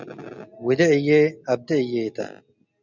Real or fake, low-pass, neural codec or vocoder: real; 7.2 kHz; none